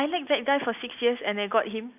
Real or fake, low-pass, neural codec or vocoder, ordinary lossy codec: real; 3.6 kHz; none; none